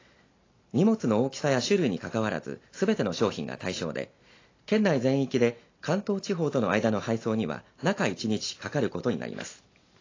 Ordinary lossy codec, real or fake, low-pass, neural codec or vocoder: AAC, 32 kbps; real; 7.2 kHz; none